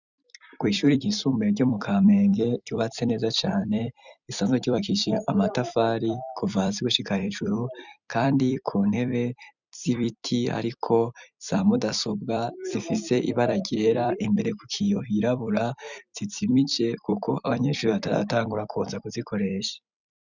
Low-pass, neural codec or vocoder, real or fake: 7.2 kHz; vocoder, 44.1 kHz, 128 mel bands every 256 samples, BigVGAN v2; fake